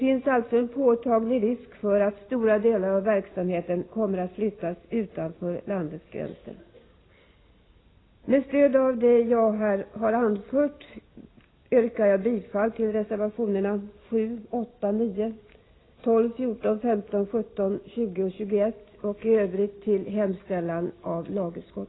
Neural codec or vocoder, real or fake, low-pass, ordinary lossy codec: none; real; 7.2 kHz; AAC, 16 kbps